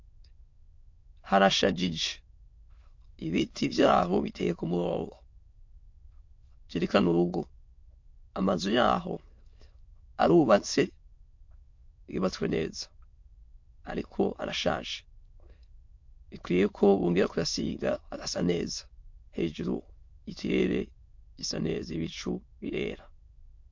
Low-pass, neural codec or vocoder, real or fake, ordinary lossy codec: 7.2 kHz; autoencoder, 22.05 kHz, a latent of 192 numbers a frame, VITS, trained on many speakers; fake; MP3, 48 kbps